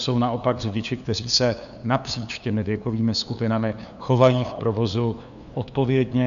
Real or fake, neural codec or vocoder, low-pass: fake; codec, 16 kHz, 2 kbps, FunCodec, trained on LibriTTS, 25 frames a second; 7.2 kHz